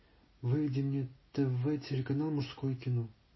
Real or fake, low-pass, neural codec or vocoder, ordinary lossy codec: real; 7.2 kHz; none; MP3, 24 kbps